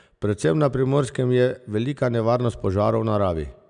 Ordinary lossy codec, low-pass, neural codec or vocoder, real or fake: none; 9.9 kHz; none; real